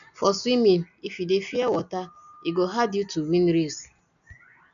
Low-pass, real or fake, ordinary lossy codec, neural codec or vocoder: 7.2 kHz; real; none; none